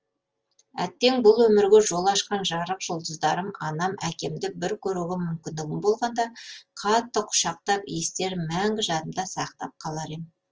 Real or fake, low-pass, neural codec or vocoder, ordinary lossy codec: real; 7.2 kHz; none; Opus, 24 kbps